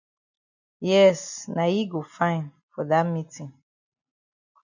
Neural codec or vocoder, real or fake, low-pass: none; real; 7.2 kHz